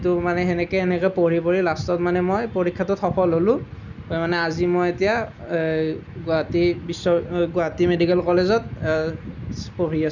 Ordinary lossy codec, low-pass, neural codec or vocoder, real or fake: none; 7.2 kHz; none; real